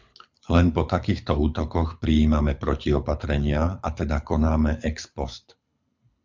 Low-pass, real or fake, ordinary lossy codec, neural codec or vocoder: 7.2 kHz; fake; MP3, 64 kbps; codec, 24 kHz, 6 kbps, HILCodec